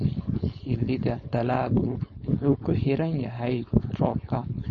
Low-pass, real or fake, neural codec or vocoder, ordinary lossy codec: 7.2 kHz; fake; codec, 16 kHz, 4.8 kbps, FACodec; MP3, 32 kbps